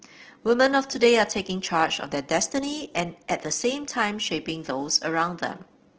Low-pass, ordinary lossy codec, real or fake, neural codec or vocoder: 7.2 kHz; Opus, 16 kbps; real; none